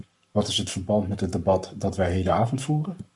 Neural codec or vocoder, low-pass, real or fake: codec, 44.1 kHz, 7.8 kbps, Pupu-Codec; 10.8 kHz; fake